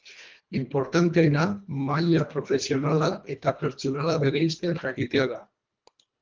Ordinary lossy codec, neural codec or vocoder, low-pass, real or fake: Opus, 24 kbps; codec, 24 kHz, 1.5 kbps, HILCodec; 7.2 kHz; fake